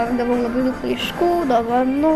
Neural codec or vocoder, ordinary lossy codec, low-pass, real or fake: none; Opus, 64 kbps; 14.4 kHz; real